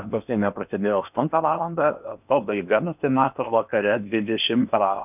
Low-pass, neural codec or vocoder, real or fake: 3.6 kHz; codec, 16 kHz in and 24 kHz out, 0.8 kbps, FocalCodec, streaming, 65536 codes; fake